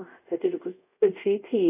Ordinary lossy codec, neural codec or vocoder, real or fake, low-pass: none; codec, 24 kHz, 0.5 kbps, DualCodec; fake; 3.6 kHz